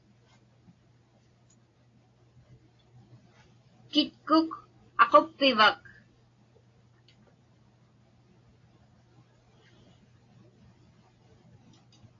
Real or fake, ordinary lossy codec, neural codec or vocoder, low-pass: real; AAC, 32 kbps; none; 7.2 kHz